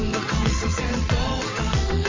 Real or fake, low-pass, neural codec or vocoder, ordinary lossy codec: fake; 7.2 kHz; vocoder, 22.05 kHz, 80 mel bands, WaveNeXt; MP3, 32 kbps